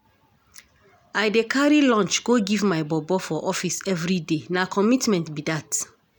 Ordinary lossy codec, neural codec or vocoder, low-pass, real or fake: none; none; none; real